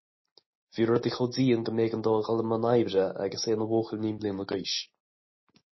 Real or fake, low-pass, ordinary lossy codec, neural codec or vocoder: real; 7.2 kHz; MP3, 24 kbps; none